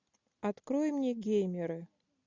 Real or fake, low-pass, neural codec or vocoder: real; 7.2 kHz; none